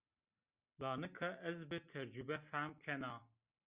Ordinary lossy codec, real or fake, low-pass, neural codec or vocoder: Opus, 64 kbps; real; 3.6 kHz; none